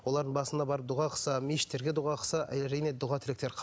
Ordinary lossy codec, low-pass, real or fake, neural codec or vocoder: none; none; real; none